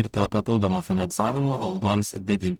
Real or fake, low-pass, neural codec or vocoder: fake; 19.8 kHz; codec, 44.1 kHz, 0.9 kbps, DAC